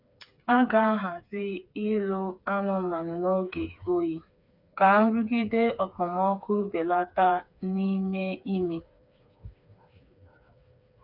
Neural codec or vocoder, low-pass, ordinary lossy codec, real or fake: codec, 16 kHz, 4 kbps, FreqCodec, smaller model; 5.4 kHz; none; fake